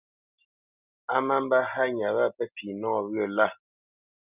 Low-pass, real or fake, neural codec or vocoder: 3.6 kHz; real; none